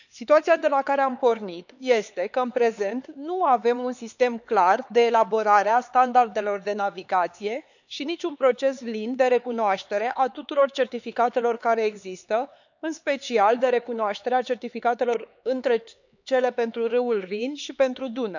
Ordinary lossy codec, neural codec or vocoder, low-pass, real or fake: none; codec, 16 kHz, 4 kbps, X-Codec, HuBERT features, trained on LibriSpeech; 7.2 kHz; fake